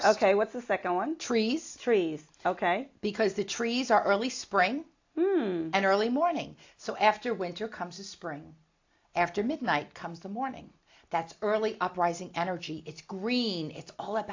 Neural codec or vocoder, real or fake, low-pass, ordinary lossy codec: none; real; 7.2 kHz; AAC, 48 kbps